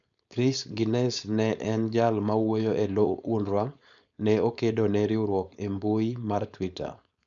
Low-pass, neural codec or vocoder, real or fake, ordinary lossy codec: 7.2 kHz; codec, 16 kHz, 4.8 kbps, FACodec; fake; none